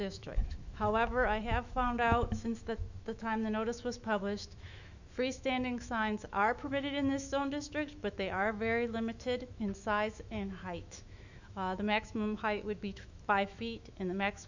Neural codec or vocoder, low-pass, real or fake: autoencoder, 48 kHz, 128 numbers a frame, DAC-VAE, trained on Japanese speech; 7.2 kHz; fake